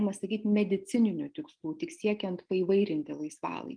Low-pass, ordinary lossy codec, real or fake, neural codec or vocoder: 9.9 kHz; Opus, 32 kbps; real; none